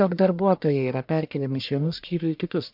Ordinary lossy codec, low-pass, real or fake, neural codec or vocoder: MP3, 48 kbps; 5.4 kHz; fake; codec, 44.1 kHz, 1.7 kbps, Pupu-Codec